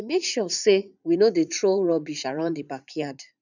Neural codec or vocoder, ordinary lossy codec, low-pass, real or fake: vocoder, 44.1 kHz, 80 mel bands, Vocos; none; 7.2 kHz; fake